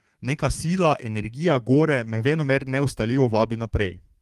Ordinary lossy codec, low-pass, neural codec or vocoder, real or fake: Opus, 32 kbps; 14.4 kHz; codec, 32 kHz, 1.9 kbps, SNAC; fake